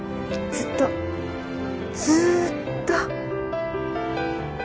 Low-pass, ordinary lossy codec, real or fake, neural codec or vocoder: none; none; real; none